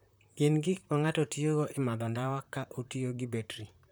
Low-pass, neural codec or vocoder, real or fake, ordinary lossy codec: none; vocoder, 44.1 kHz, 128 mel bands, Pupu-Vocoder; fake; none